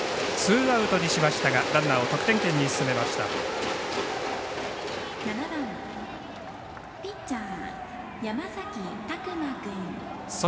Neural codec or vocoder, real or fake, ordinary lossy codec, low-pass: none; real; none; none